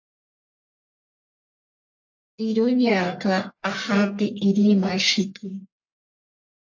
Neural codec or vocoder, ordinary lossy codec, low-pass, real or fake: codec, 44.1 kHz, 1.7 kbps, Pupu-Codec; AAC, 48 kbps; 7.2 kHz; fake